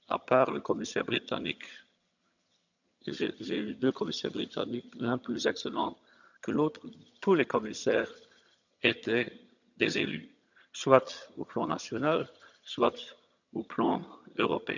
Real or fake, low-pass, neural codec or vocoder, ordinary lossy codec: fake; 7.2 kHz; vocoder, 22.05 kHz, 80 mel bands, HiFi-GAN; none